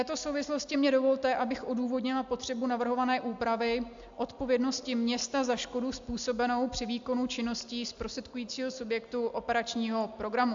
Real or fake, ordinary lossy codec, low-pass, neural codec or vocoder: real; AAC, 64 kbps; 7.2 kHz; none